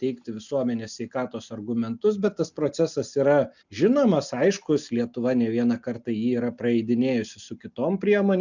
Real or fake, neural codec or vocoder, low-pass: real; none; 7.2 kHz